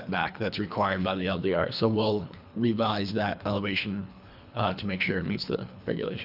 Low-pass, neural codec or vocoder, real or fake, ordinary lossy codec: 5.4 kHz; codec, 24 kHz, 3 kbps, HILCodec; fake; AAC, 48 kbps